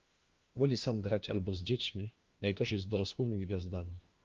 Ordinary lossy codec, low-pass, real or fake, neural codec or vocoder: Opus, 32 kbps; 7.2 kHz; fake; codec, 16 kHz, 1 kbps, FunCodec, trained on LibriTTS, 50 frames a second